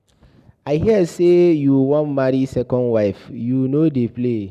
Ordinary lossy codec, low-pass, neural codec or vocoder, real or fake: none; 14.4 kHz; none; real